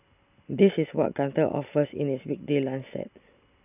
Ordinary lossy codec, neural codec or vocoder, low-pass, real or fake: none; none; 3.6 kHz; real